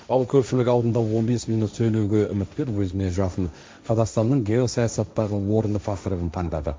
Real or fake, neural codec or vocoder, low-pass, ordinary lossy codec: fake; codec, 16 kHz, 1.1 kbps, Voila-Tokenizer; none; none